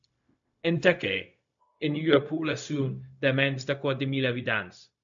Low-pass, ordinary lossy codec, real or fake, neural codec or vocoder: 7.2 kHz; MP3, 64 kbps; fake; codec, 16 kHz, 0.4 kbps, LongCat-Audio-Codec